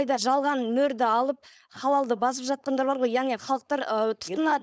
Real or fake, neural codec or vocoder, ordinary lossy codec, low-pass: fake; codec, 16 kHz, 4.8 kbps, FACodec; none; none